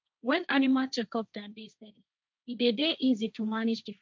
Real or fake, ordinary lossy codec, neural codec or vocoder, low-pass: fake; none; codec, 16 kHz, 1.1 kbps, Voila-Tokenizer; none